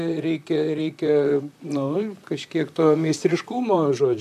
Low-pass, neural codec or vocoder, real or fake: 14.4 kHz; vocoder, 44.1 kHz, 128 mel bands, Pupu-Vocoder; fake